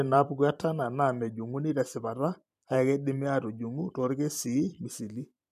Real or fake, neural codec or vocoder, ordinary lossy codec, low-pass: fake; vocoder, 44.1 kHz, 128 mel bands every 256 samples, BigVGAN v2; MP3, 96 kbps; 14.4 kHz